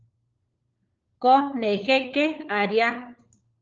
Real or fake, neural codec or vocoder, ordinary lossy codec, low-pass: fake; codec, 16 kHz, 4 kbps, FunCodec, trained on LibriTTS, 50 frames a second; Opus, 24 kbps; 7.2 kHz